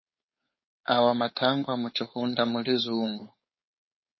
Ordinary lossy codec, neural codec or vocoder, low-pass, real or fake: MP3, 24 kbps; codec, 16 kHz, 4.8 kbps, FACodec; 7.2 kHz; fake